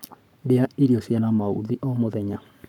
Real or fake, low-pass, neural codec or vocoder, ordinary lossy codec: fake; 19.8 kHz; codec, 44.1 kHz, 7.8 kbps, Pupu-Codec; none